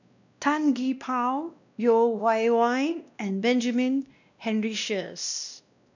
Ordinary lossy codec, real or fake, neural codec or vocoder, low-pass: none; fake; codec, 16 kHz, 1 kbps, X-Codec, WavLM features, trained on Multilingual LibriSpeech; 7.2 kHz